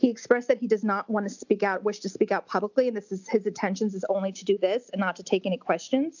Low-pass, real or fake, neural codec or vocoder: 7.2 kHz; real; none